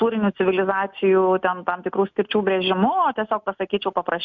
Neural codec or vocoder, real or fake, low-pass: none; real; 7.2 kHz